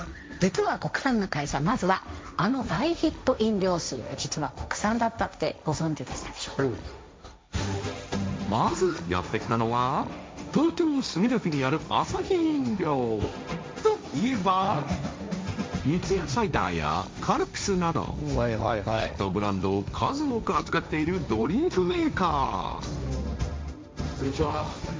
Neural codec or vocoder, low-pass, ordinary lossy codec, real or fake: codec, 16 kHz, 1.1 kbps, Voila-Tokenizer; none; none; fake